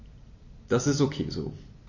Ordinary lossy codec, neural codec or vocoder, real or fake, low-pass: MP3, 32 kbps; none; real; 7.2 kHz